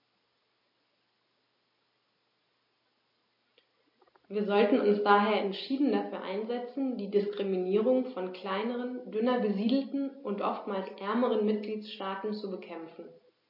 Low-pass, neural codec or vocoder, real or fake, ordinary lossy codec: 5.4 kHz; none; real; MP3, 32 kbps